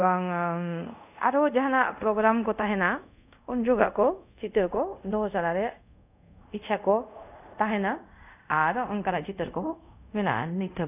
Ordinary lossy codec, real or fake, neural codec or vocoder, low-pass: none; fake; codec, 24 kHz, 0.5 kbps, DualCodec; 3.6 kHz